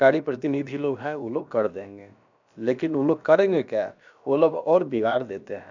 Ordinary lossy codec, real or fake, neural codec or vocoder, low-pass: none; fake; codec, 16 kHz, about 1 kbps, DyCAST, with the encoder's durations; 7.2 kHz